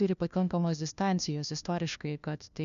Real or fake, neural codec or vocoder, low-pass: fake; codec, 16 kHz, 1 kbps, FunCodec, trained on LibriTTS, 50 frames a second; 7.2 kHz